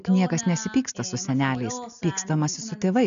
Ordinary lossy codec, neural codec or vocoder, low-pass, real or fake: AAC, 96 kbps; none; 7.2 kHz; real